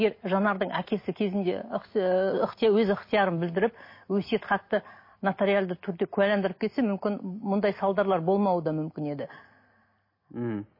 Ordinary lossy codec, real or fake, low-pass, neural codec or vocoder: MP3, 24 kbps; real; 5.4 kHz; none